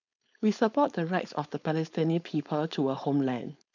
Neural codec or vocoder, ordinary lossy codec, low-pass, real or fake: codec, 16 kHz, 4.8 kbps, FACodec; none; 7.2 kHz; fake